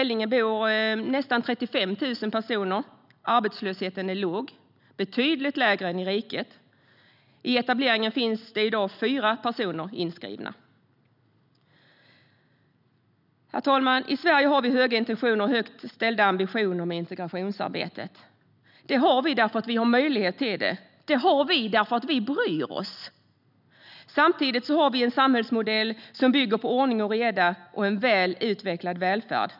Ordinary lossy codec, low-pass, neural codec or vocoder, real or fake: none; 5.4 kHz; none; real